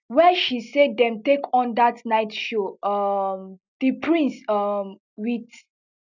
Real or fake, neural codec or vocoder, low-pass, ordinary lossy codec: real; none; 7.2 kHz; none